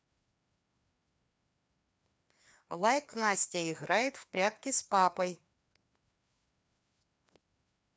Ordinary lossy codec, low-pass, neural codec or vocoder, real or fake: none; none; codec, 16 kHz, 2 kbps, FreqCodec, larger model; fake